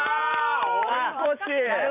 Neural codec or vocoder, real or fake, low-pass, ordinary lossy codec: vocoder, 44.1 kHz, 128 mel bands every 512 samples, BigVGAN v2; fake; 3.6 kHz; none